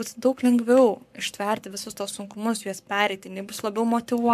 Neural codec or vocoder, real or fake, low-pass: codec, 44.1 kHz, 7.8 kbps, Pupu-Codec; fake; 14.4 kHz